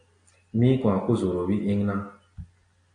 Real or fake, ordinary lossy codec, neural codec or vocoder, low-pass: real; AAC, 48 kbps; none; 9.9 kHz